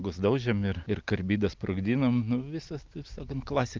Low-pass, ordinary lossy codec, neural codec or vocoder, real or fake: 7.2 kHz; Opus, 32 kbps; none; real